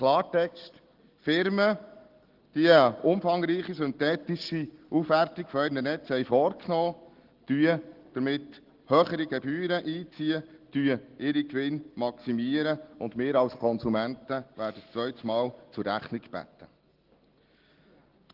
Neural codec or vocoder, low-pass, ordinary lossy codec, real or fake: none; 5.4 kHz; Opus, 16 kbps; real